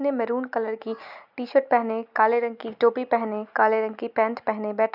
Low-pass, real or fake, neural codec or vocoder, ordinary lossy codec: 5.4 kHz; real; none; none